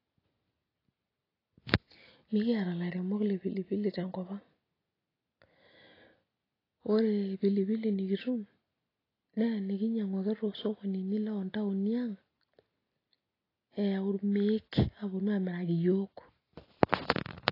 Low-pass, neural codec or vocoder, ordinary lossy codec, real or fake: 5.4 kHz; none; AAC, 24 kbps; real